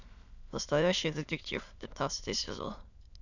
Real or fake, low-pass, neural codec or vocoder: fake; 7.2 kHz; autoencoder, 22.05 kHz, a latent of 192 numbers a frame, VITS, trained on many speakers